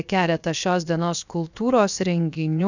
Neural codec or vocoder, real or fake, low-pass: codec, 16 kHz, 0.7 kbps, FocalCodec; fake; 7.2 kHz